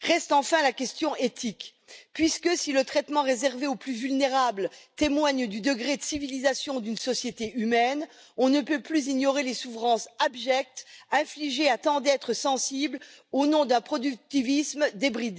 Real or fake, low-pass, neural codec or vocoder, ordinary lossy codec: real; none; none; none